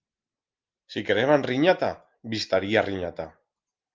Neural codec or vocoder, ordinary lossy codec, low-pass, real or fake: none; Opus, 32 kbps; 7.2 kHz; real